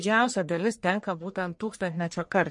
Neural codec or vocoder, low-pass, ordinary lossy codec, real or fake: codec, 44.1 kHz, 1.7 kbps, Pupu-Codec; 10.8 kHz; MP3, 48 kbps; fake